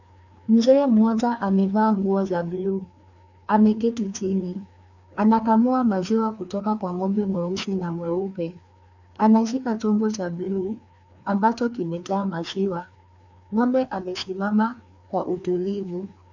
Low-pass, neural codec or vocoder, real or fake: 7.2 kHz; codec, 16 kHz, 2 kbps, FreqCodec, larger model; fake